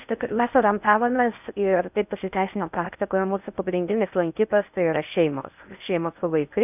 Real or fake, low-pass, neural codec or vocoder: fake; 3.6 kHz; codec, 16 kHz in and 24 kHz out, 0.6 kbps, FocalCodec, streaming, 4096 codes